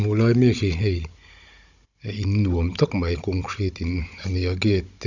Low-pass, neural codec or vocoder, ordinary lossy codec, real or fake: 7.2 kHz; codec, 16 kHz, 16 kbps, FunCodec, trained on Chinese and English, 50 frames a second; none; fake